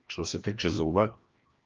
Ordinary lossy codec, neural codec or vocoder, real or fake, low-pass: Opus, 24 kbps; codec, 16 kHz, 1 kbps, FreqCodec, larger model; fake; 7.2 kHz